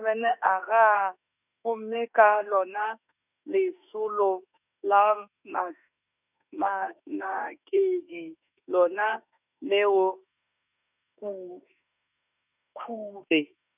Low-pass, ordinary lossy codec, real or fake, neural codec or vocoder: 3.6 kHz; none; fake; autoencoder, 48 kHz, 32 numbers a frame, DAC-VAE, trained on Japanese speech